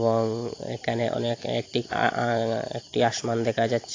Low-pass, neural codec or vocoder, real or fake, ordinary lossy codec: 7.2 kHz; none; real; AAC, 32 kbps